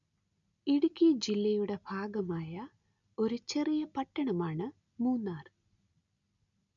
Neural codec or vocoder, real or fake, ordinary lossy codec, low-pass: none; real; none; 7.2 kHz